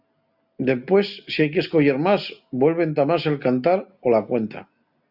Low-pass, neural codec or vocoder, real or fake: 5.4 kHz; none; real